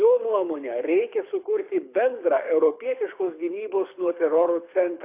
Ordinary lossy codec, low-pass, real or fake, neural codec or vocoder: AAC, 24 kbps; 3.6 kHz; fake; codec, 24 kHz, 6 kbps, HILCodec